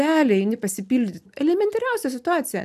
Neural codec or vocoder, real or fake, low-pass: none; real; 14.4 kHz